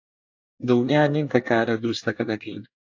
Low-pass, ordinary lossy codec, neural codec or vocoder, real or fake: 7.2 kHz; AAC, 48 kbps; codec, 24 kHz, 1 kbps, SNAC; fake